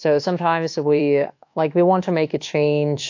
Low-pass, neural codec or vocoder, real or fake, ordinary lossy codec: 7.2 kHz; codec, 24 kHz, 1.2 kbps, DualCodec; fake; AAC, 48 kbps